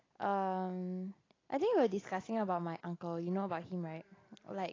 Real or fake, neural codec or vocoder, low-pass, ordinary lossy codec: real; none; 7.2 kHz; AAC, 32 kbps